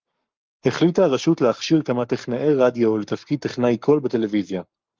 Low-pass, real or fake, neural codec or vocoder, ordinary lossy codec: 7.2 kHz; fake; codec, 44.1 kHz, 7.8 kbps, Pupu-Codec; Opus, 32 kbps